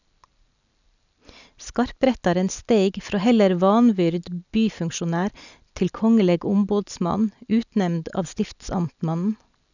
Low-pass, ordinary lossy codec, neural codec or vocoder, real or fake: 7.2 kHz; none; none; real